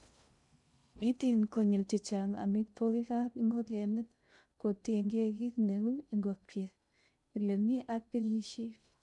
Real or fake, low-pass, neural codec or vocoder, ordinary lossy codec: fake; 10.8 kHz; codec, 16 kHz in and 24 kHz out, 0.6 kbps, FocalCodec, streaming, 2048 codes; none